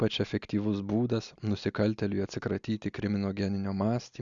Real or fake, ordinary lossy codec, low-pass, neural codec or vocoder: real; Opus, 64 kbps; 7.2 kHz; none